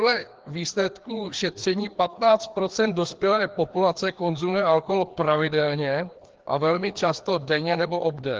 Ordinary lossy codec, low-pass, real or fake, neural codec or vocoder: Opus, 16 kbps; 7.2 kHz; fake; codec, 16 kHz, 2 kbps, FreqCodec, larger model